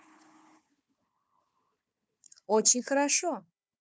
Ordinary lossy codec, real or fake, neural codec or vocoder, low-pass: none; fake; codec, 16 kHz, 4 kbps, FunCodec, trained on Chinese and English, 50 frames a second; none